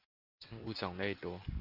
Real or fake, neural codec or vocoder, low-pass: real; none; 5.4 kHz